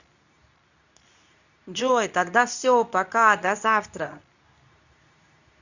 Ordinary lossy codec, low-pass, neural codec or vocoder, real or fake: none; 7.2 kHz; codec, 24 kHz, 0.9 kbps, WavTokenizer, medium speech release version 2; fake